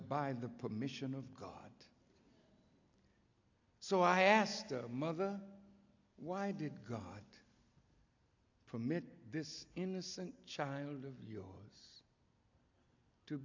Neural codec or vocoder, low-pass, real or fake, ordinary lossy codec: none; 7.2 kHz; real; AAC, 48 kbps